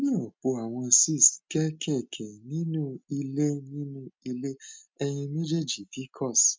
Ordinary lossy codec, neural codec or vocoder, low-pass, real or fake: none; none; none; real